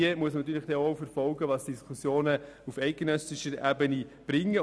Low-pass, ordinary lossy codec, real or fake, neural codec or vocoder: none; none; real; none